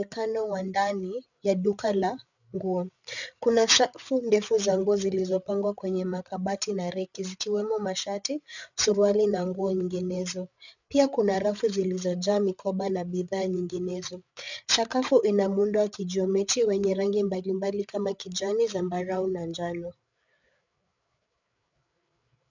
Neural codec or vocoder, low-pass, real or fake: codec, 16 kHz, 8 kbps, FreqCodec, larger model; 7.2 kHz; fake